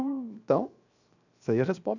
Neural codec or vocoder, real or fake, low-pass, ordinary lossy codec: codec, 16 kHz, 0.7 kbps, FocalCodec; fake; 7.2 kHz; none